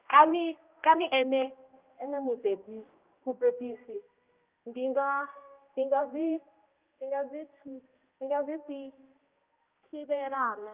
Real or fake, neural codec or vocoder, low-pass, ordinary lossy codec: fake; codec, 16 kHz, 1 kbps, X-Codec, HuBERT features, trained on general audio; 3.6 kHz; Opus, 32 kbps